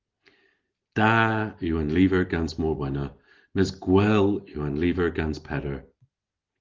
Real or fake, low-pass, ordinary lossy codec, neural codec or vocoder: real; 7.2 kHz; Opus, 16 kbps; none